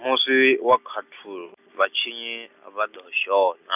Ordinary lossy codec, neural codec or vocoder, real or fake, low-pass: none; none; real; 3.6 kHz